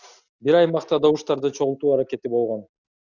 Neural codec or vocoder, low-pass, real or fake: none; 7.2 kHz; real